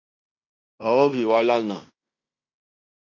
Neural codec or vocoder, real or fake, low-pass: codec, 16 kHz in and 24 kHz out, 0.9 kbps, LongCat-Audio-Codec, fine tuned four codebook decoder; fake; 7.2 kHz